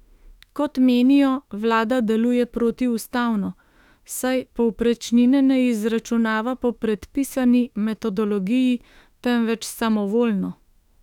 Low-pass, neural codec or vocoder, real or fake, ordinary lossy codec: 19.8 kHz; autoencoder, 48 kHz, 32 numbers a frame, DAC-VAE, trained on Japanese speech; fake; none